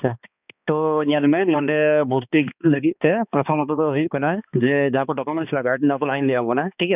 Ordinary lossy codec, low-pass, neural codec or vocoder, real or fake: none; 3.6 kHz; codec, 16 kHz, 2 kbps, X-Codec, HuBERT features, trained on balanced general audio; fake